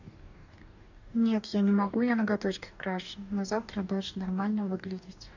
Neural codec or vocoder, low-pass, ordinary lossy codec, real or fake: codec, 44.1 kHz, 2.6 kbps, DAC; 7.2 kHz; none; fake